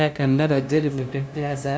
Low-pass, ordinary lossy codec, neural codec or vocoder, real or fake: none; none; codec, 16 kHz, 0.5 kbps, FunCodec, trained on LibriTTS, 25 frames a second; fake